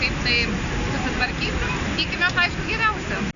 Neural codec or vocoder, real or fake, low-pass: none; real; 7.2 kHz